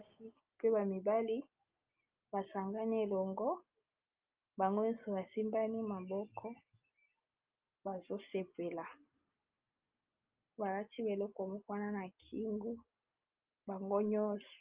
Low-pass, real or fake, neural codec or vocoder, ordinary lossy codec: 3.6 kHz; real; none; Opus, 24 kbps